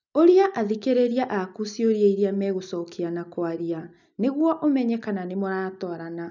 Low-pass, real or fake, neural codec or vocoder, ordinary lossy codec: 7.2 kHz; real; none; none